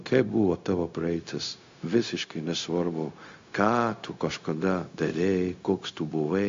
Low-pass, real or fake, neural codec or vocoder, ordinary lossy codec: 7.2 kHz; fake; codec, 16 kHz, 0.4 kbps, LongCat-Audio-Codec; AAC, 48 kbps